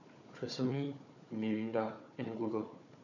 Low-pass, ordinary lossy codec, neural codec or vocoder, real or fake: 7.2 kHz; none; codec, 16 kHz, 4 kbps, FunCodec, trained on Chinese and English, 50 frames a second; fake